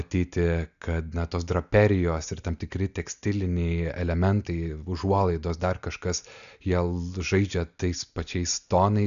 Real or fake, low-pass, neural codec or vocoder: real; 7.2 kHz; none